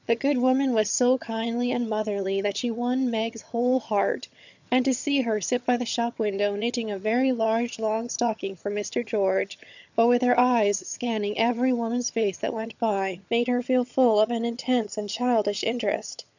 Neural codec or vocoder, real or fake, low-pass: vocoder, 22.05 kHz, 80 mel bands, HiFi-GAN; fake; 7.2 kHz